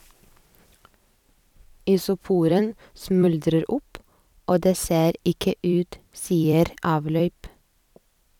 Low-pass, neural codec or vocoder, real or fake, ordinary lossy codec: 19.8 kHz; vocoder, 44.1 kHz, 128 mel bands every 256 samples, BigVGAN v2; fake; none